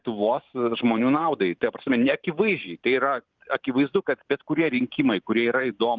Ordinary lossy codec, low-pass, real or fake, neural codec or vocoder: Opus, 32 kbps; 7.2 kHz; fake; vocoder, 44.1 kHz, 128 mel bands every 512 samples, BigVGAN v2